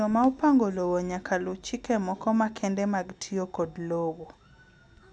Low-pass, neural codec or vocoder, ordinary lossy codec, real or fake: none; none; none; real